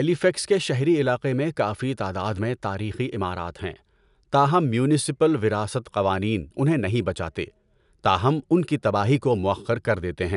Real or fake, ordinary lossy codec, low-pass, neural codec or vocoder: real; none; 10.8 kHz; none